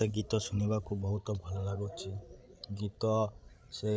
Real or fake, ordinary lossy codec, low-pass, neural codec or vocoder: fake; none; none; codec, 16 kHz, 16 kbps, FreqCodec, larger model